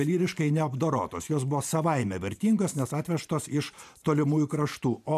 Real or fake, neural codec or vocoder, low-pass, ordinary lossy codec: fake; vocoder, 44.1 kHz, 128 mel bands every 512 samples, BigVGAN v2; 14.4 kHz; MP3, 96 kbps